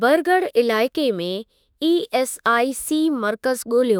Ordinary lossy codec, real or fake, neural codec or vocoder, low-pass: none; fake; autoencoder, 48 kHz, 32 numbers a frame, DAC-VAE, trained on Japanese speech; none